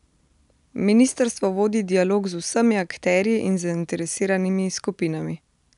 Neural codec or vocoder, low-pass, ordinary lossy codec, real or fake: none; 10.8 kHz; none; real